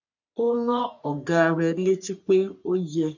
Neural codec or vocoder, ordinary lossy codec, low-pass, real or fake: codec, 44.1 kHz, 3.4 kbps, Pupu-Codec; none; 7.2 kHz; fake